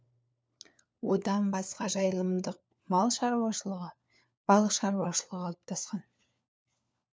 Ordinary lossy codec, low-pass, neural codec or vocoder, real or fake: none; none; codec, 16 kHz, 4 kbps, FunCodec, trained on LibriTTS, 50 frames a second; fake